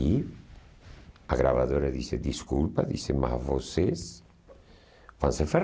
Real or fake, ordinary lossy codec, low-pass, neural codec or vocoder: real; none; none; none